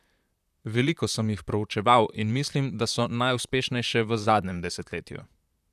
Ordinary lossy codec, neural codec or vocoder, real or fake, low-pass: none; vocoder, 44.1 kHz, 128 mel bands, Pupu-Vocoder; fake; 14.4 kHz